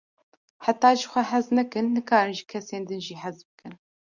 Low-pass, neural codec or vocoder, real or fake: 7.2 kHz; none; real